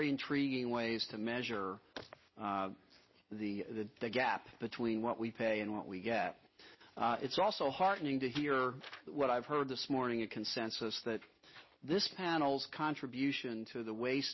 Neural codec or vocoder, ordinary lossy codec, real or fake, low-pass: none; MP3, 24 kbps; real; 7.2 kHz